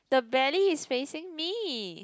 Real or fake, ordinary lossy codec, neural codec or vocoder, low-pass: real; none; none; none